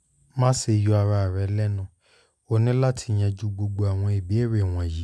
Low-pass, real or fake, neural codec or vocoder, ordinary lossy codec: none; real; none; none